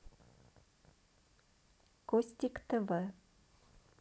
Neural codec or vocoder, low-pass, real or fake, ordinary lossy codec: none; none; real; none